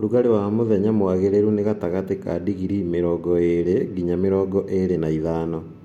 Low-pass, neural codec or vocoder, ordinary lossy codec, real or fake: 19.8 kHz; none; MP3, 64 kbps; real